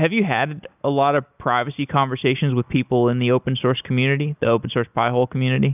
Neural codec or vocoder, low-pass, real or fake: none; 3.6 kHz; real